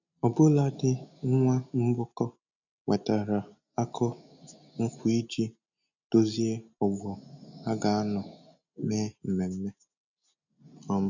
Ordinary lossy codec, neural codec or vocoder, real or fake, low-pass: none; none; real; 7.2 kHz